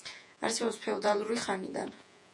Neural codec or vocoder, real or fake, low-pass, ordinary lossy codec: vocoder, 48 kHz, 128 mel bands, Vocos; fake; 10.8 kHz; MP3, 64 kbps